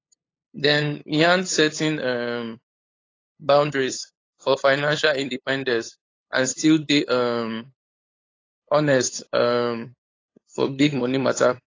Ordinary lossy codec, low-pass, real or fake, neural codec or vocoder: AAC, 32 kbps; 7.2 kHz; fake; codec, 16 kHz, 8 kbps, FunCodec, trained on LibriTTS, 25 frames a second